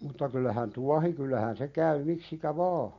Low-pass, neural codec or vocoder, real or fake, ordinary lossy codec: 7.2 kHz; none; real; MP3, 48 kbps